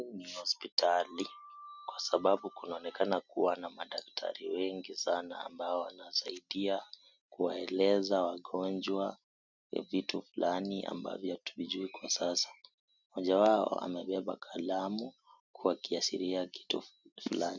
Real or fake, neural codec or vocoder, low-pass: real; none; 7.2 kHz